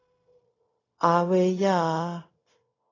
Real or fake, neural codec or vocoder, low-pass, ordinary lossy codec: fake; codec, 16 kHz, 0.4 kbps, LongCat-Audio-Codec; 7.2 kHz; AAC, 32 kbps